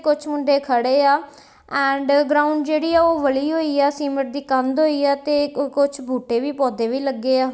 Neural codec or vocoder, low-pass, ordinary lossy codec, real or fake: none; none; none; real